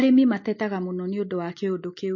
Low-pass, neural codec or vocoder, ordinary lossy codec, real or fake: 7.2 kHz; none; MP3, 32 kbps; real